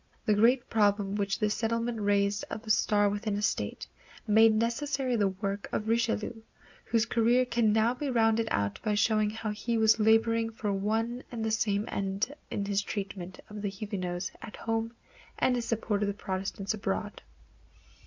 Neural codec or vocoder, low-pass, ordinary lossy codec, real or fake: none; 7.2 kHz; MP3, 64 kbps; real